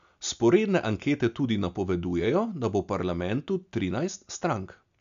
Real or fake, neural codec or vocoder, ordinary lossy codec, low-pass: real; none; AAC, 96 kbps; 7.2 kHz